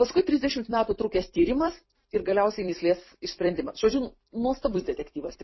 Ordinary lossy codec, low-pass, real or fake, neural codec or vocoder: MP3, 24 kbps; 7.2 kHz; fake; vocoder, 44.1 kHz, 128 mel bands every 256 samples, BigVGAN v2